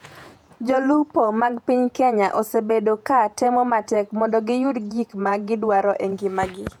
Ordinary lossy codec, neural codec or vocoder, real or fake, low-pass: none; vocoder, 48 kHz, 128 mel bands, Vocos; fake; 19.8 kHz